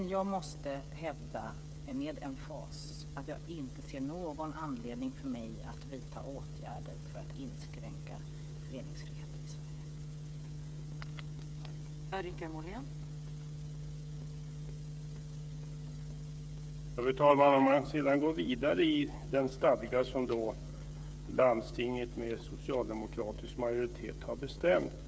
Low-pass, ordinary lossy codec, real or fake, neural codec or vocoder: none; none; fake; codec, 16 kHz, 8 kbps, FreqCodec, smaller model